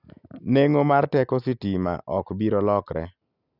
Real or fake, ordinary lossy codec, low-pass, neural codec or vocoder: real; none; 5.4 kHz; none